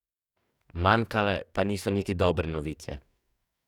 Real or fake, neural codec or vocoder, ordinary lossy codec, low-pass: fake; codec, 44.1 kHz, 2.6 kbps, DAC; none; 19.8 kHz